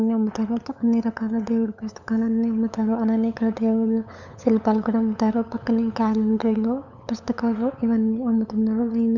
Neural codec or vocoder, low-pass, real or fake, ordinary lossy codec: codec, 16 kHz, 8 kbps, FunCodec, trained on LibriTTS, 25 frames a second; 7.2 kHz; fake; none